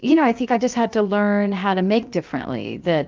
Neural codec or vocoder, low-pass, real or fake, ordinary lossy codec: codec, 16 kHz, 0.7 kbps, FocalCodec; 7.2 kHz; fake; Opus, 24 kbps